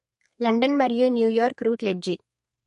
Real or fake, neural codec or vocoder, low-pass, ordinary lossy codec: fake; codec, 32 kHz, 1.9 kbps, SNAC; 14.4 kHz; MP3, 48 kbps